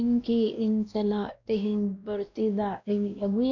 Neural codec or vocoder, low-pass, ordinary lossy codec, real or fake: codec, 16 kHz, 1 kbps, X-Codec, WavLM features, trained on Multilingual LibriSpeech; 7.2 kHz; none; fake